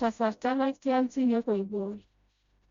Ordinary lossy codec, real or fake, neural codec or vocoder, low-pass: Opus, 64 kbps; fake; codec, 16 kHz, 0.5 kbps, FreqCodec, smaller model; 7.2 kHz